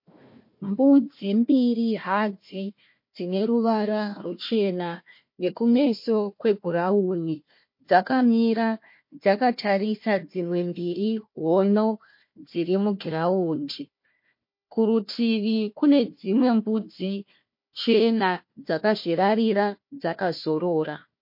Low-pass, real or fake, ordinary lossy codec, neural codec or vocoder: 5.4 kHz; fake; MP3, 32 kbps; codec, 16 kHz, 1 kbps, FunCodec, trained on Chinese and English, 50 frames a second